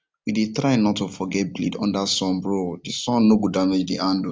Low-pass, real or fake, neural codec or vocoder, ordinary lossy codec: none; real; none; none